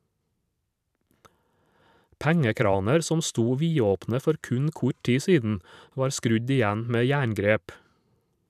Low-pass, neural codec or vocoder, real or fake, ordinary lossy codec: 14.4 kHz; vocoder, 44.1 kHz, 128 mel bands every 512 samples, BigVGAN v2; fake; none